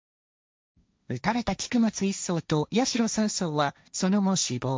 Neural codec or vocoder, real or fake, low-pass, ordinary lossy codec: codec, 16 kHz, 1.1 kbps, Voila-Tokenizer; fake; none; none